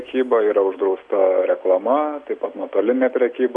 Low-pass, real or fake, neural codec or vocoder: 10.8 kHz; real; none